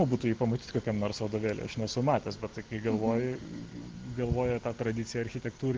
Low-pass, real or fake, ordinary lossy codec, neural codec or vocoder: 7.2 kHz; real; Opus, 16 kbps; none